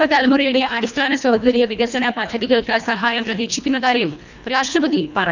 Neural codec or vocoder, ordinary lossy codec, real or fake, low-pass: codec, 24 kHz, 1.5 kbps, HILCodec; none; fake; 7.2 kHz